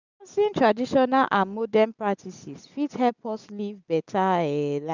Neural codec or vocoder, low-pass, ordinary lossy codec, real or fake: none; 7.2 kHz; none; real